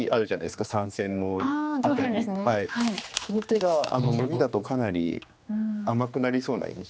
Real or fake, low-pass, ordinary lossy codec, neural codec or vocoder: fake; none; none; codec, 16 kHz, 2 kbps, X-Codec, HuBERT features, trained on general audio